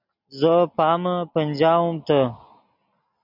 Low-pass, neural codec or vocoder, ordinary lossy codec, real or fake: 5.4 kHz; none; MP3, 48 kbps; real